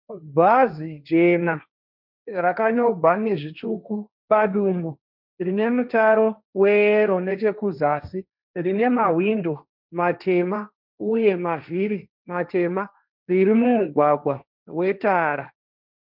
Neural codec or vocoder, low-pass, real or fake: codec, 16 kHz, 1.1 kbps, Voila-Tokenizer; 5.4 kHz; fake